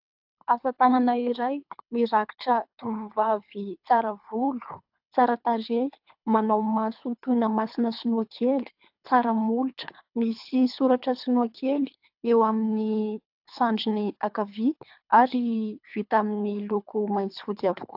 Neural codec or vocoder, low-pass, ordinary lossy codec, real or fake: codec, 24 kHz, 3 kbps, HILCodec; 5.4 kHz; AAC, 48 kbps; fake